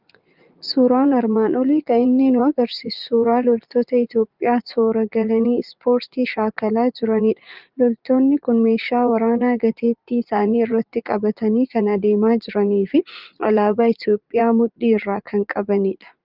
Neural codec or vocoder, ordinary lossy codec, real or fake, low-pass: vocoder, 44.1 kHz, 80 mel bands, Vocos; Opus, 32 kbps; fake; 5.4 kHz